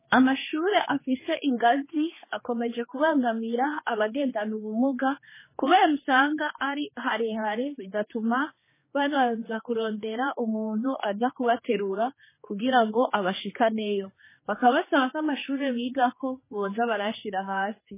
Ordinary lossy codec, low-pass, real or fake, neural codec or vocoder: MP3, 16 kbps; 3.6 kHz; fake; codec, 16 kHz, 2 kbps, X-Codec, HuBERT features, trained on general audio